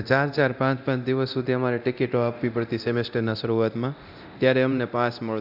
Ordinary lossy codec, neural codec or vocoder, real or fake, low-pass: none; codec, 24 kHz, 0.9 kbps, DualCodec; fake; 5.4 kHz